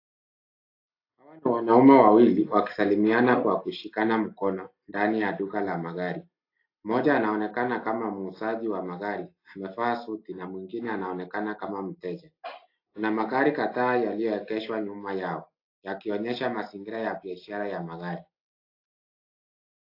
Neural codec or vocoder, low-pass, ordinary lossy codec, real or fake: none; 5.4 kHz; AAC, 32 kbps; real